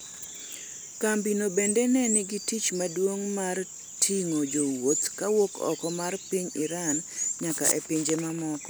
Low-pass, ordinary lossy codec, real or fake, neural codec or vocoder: none; none; real; none